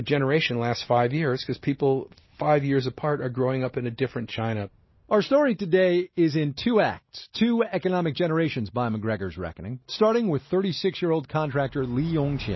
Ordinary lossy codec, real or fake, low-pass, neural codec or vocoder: MP3, 24 kbps; real; 7.2 kHz; none